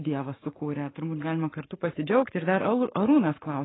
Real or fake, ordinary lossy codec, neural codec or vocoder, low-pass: fake; AAC, 16 kbps; codec, 44.1 kHz, 7.8 kbps, Pupu-Codec; 7.2 kHz